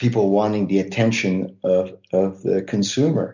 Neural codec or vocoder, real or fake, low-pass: none; real; 7.2 kHz